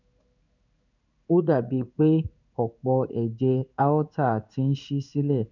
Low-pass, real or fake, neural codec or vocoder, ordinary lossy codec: 7.2 kHz; fake; codec, 16 kHz in and 24 kHz out, 1 kbps, XY-Tokenizer; none